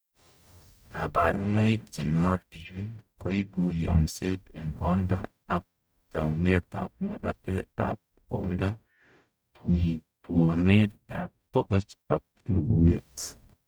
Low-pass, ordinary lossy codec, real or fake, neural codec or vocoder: none; none; fake; codec, 44.1 kHz, 0.9 kbps, DAC